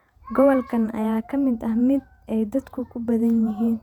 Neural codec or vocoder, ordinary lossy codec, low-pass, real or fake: vocoder, 48 kHz, 128 mel bands, Vocos; none; 19.8 kHz; fake